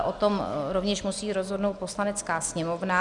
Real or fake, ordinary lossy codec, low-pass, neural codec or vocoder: real; Opus, 64 kbps; 10.8 kHz; none